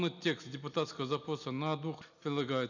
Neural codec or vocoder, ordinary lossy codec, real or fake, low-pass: none; none; real; 7.2 kHz